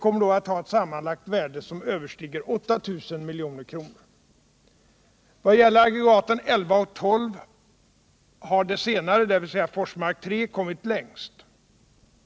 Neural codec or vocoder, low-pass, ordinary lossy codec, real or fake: none; none; none; real